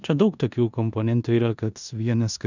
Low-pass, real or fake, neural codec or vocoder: 7.2 kHz; fake; codec, 16 kHz in and 24 kHz out, 0.9 kbps, LongCat-Audio-Codec, four codebook decoder